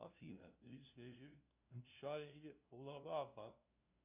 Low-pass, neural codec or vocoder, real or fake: 3.6 kHz; codec, 16 kHz, 0.5 kbps, FunCodec, trained on LibriTTS, 25 frames a second; fake